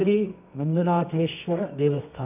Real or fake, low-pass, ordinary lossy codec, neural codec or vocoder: fake; 3.6 kHz; none; codec, 24 kHz, 0.9 kbps, WavTokenizer, medium music audio release